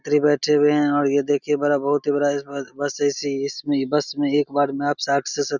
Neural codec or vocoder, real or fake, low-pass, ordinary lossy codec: none; real; 7.2 kHz; none